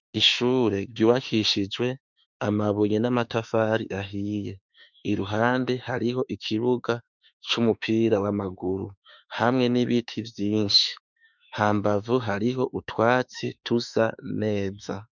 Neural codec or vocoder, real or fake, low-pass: autoencoder, 48 kHz, 32 numbers a frame, DAC-VAE, trained on Japanese speech; fake; 7.2 kHz